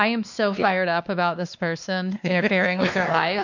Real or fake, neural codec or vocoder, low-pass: fake; codec, 16 kHz, 2 kbps, X-Codec, WavLM features, trained on Multilingual LibriSpeech; 7.2 kHz